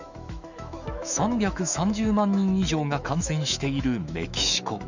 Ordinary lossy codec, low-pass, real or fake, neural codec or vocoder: none; 7.2 kHz; fake; autoencoder, 48 kHz, 128 numbers a frame, DAC-VAE, trained on Japanese speech